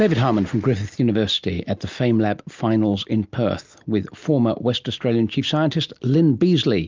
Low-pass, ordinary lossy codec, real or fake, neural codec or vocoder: 7.2 kHz; Opus, 32 kbps; real; none